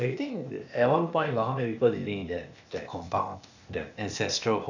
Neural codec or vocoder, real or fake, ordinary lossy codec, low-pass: codec, 16 kHz, 0.8 kbps, ZipCodec; fake; none; 7.2 kHz